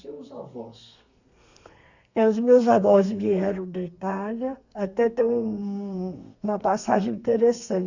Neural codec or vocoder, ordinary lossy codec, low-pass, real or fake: codec, 32 kHz, 1.9 kbps, SNAC; Opus, 64 kbps; 7.2 kHz; fake